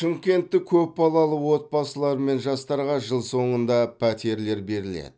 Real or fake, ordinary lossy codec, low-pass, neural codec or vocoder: real; none; none; none